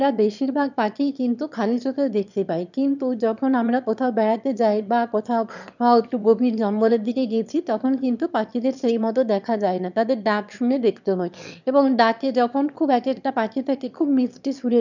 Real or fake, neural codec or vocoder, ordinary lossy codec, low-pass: fake; autoencoder, 22.05 kHz, a latent of 192 numbers a frame, VITS, trained on one speaker; none; 7.2 kHz